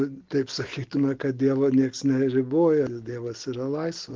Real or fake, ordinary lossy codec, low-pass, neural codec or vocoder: real; Opus, 16 kbps; 7.2 kHz; none